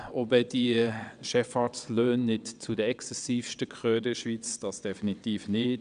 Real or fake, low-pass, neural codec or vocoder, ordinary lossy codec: fake; 9.9 kHz; vocoder, 22.05 kHz, 80 mel bands, WaveNeXt; none